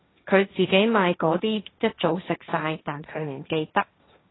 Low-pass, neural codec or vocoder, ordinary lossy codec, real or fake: 7.2 kHz; codec, 16 kHz, 1.1 kbps, Voila-Tokenizer; AAC, 16 kbps; fake